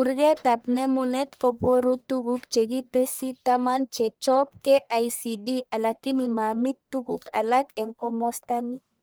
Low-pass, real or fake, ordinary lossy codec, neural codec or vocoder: none; fake; none; codec, 44.1 kHz, 1.7 kbps, Pupu-Codec